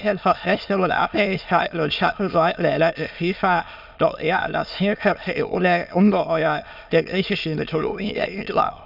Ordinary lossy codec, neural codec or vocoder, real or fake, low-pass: none; autoencoder, 22.05 kHz, a latent of 192 numbers a frame, VITS, trained on many speakers; fake; 5.4 kHz